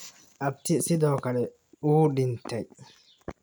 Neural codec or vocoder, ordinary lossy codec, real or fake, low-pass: none; none; real; none